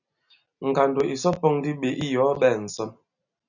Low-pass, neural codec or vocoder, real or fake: 7.2 kHz; none; real